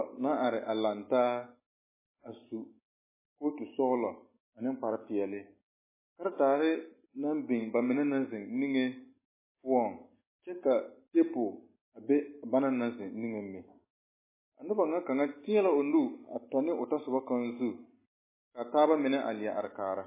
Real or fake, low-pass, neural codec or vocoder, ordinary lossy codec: real; 3.6 kHz; none; MP3, 16 kbps